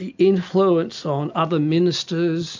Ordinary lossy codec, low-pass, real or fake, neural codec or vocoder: MP3, 64 kbps; 7.2 kHz; real; none